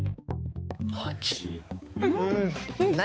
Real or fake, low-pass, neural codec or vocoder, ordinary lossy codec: fake; none; codec, 16 kHz, 4 kbps, X-Codec, HuBERT features, trained on balanced general audio; none